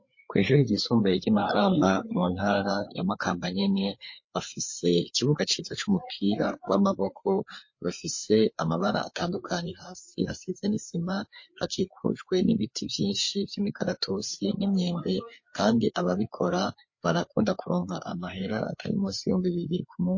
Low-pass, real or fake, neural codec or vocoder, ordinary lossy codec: 7.2 kHz; fake; codec, 16 kHz, 4 kbps, FreqCodec, larger model; MP3, 32 kbps